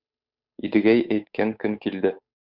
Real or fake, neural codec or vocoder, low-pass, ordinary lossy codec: fake; codec, 16 kHz, 8 kbps, FunCodec, trained on Chinese and English, 25 frames a second; 5.4 kHz; Opus, 64 kbps